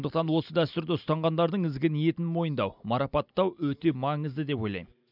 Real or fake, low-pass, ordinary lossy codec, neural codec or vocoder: real; 5.4 kHz; none; none